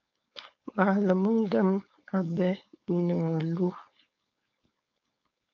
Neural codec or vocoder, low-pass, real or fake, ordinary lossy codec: codec, 16 kHz, 4.8 kbps, FACodec; 7.2 kHz; fake; MP3, 48 kbps